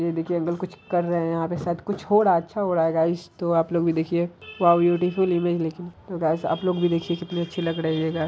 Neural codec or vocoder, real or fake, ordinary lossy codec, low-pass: none; real; none; none